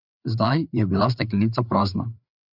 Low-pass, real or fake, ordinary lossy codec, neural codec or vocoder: 5.4 kHz; fake; none; codec, 16 kHz, 4 kbps, FreqCodec, larger model